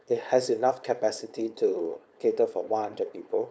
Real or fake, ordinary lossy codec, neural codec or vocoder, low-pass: fake; none; codec, 16 kHz, 4.8 kbps, FACodec; none